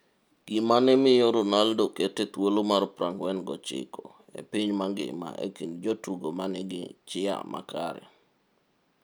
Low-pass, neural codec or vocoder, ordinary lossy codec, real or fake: none; none; none; real